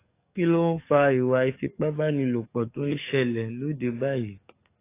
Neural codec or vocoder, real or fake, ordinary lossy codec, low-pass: codec, 44.1 kHz, 7.8 kbps, DAC; fake; AAC, 24 kbps; 3.6 kHz